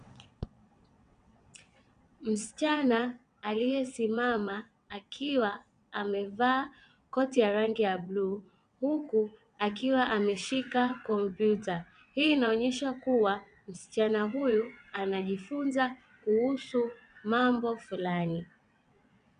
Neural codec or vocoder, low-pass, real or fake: vocoder, 22.05 kHz, 80 mel bands, WaveNeXt; 9.9 kHz; fake